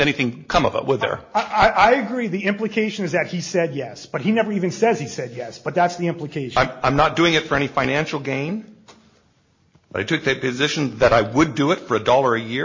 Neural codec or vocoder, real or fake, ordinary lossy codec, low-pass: none; real; MP3, 32 kbps; 7.2 kHz